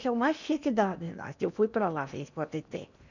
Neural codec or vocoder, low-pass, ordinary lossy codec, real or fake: codec, 16 kHz in and 24 kHz out, 0.8 kbps, FocalCodec, streaming, 65536 codes; 7.2 kHz; none; fake